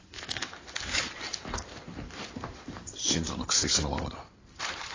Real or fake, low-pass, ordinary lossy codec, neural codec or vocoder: fake; 7.2 kHz; AAC, 32 kbps; codec, 16 kHz in and 24 kHz out, 2.2 kbps, FireRedTTS-2 codec